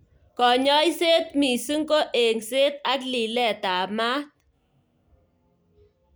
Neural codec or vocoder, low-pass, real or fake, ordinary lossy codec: none; none; real; none